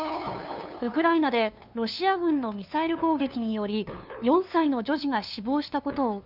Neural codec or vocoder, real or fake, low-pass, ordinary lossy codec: codec, 16 kHz, 2 kbps, FunCodec, trained on LibriTTS, 25 frames a second; fake; 5.4 kHz; none